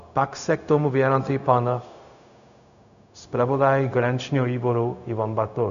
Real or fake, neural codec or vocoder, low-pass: fake; codec, 16 kHz, 0.4 kbps, LongCat-Audio-Codec; 7.2 kHz